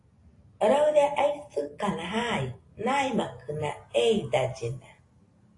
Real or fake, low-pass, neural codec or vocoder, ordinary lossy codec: real; 10.8 kHz; none; AAC, 48 kbps